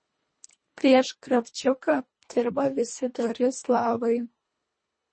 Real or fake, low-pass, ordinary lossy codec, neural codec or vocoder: fake; 9.9 kHz; MP3, 32 kbps; codec, 24 kHz, 1.5 kbps, HILCodec